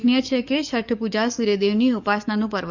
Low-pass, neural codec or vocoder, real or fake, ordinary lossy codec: 7.2 kHz; codec, 44.1 kHz, 7.8 kbps, DAC; fake; Opus, 64 kbps